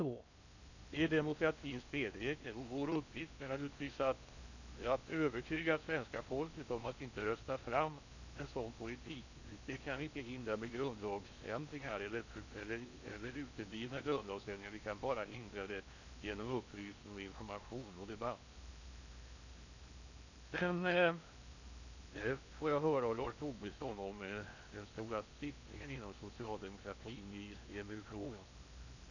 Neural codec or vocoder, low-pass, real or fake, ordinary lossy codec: codec, 16 kHz in and 24 kHz out, 0.8 kbps, FocalCodec, streaming, 65536 codes; 7.2 kHz; fake; none